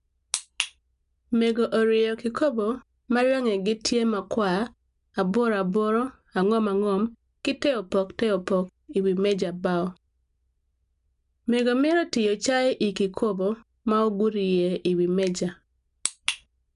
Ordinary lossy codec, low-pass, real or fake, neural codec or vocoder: none; 10.8 kHz; real; none